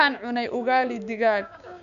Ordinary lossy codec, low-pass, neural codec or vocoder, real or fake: none; 7.2 kHz; codec, 16 kHz, 6 kbps, DAC; fake